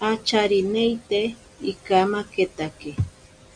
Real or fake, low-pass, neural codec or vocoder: real; 9.9 kHz; none